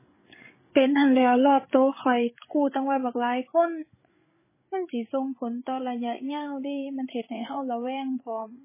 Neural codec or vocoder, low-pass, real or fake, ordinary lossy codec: codec, 16 kHz, 8 kbps, FreqCodec, larger model; 3.6 kHz; fake; MP3, 16 kbps